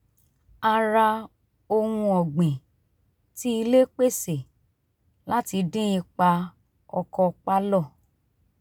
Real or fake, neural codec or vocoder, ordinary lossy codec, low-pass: real; none; none; none